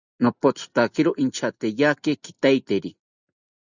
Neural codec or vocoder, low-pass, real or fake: none; 7.2 kHz; real